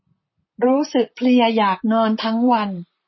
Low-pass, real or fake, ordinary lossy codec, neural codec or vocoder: 7.2 kHz; fake; MP3, 24 kbps; vocoder, 44.1 kHz, 128 mel bands, Pupu-Vocoder